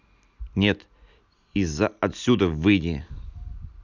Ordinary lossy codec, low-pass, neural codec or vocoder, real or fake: none; 7.2 kHz; none; real